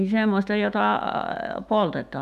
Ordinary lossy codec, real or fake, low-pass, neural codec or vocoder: none; fake; 14.4 kHz; autoencoder, 48 kHz, 128 numbers a frame, DAC-VAE, trained on Japanese speech